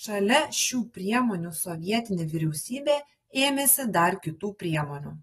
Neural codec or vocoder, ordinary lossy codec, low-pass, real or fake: none; AAC, 32 kbps; 19.8 kHz; real